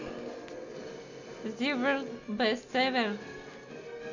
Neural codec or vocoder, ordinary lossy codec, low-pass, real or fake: none; none; 7.2 kHz; real